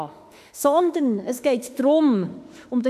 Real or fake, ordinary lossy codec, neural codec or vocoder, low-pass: fake; none; autoencoder, 48 kHz, 32 numbers a frame, DAC-VAE, trained on Japanese speech; 14.4 kHz